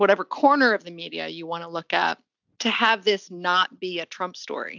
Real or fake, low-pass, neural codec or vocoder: real; 7.2 kHz; none